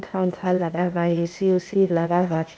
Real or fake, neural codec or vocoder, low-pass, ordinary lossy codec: fake; codec, 16 kHz, 0.8 kbps, ZipCodec; none; none